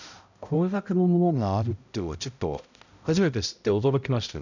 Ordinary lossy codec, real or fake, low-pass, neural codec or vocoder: none; fake; 7.2 kHz; codec, 16 kHz, 0.5 kbps, X-Codec, HuBERT features, trained on balanced general audio